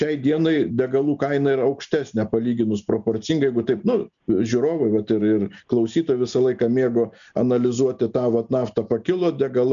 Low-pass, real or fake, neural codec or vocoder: 7.2 kHz; real; none